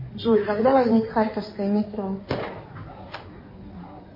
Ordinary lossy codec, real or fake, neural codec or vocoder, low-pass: MP3, 24 kbps; fake; codec, 16 kHz in and 24 kHz out, 2.2 kbps, FireRedTTS-2 codec; 5.4 kHz